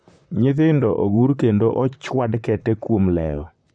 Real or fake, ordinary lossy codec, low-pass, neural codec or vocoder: fake; none; 9.9 kHz; vocoder, 44.1 kHz, 128 mel bands, Pupu-Vocoder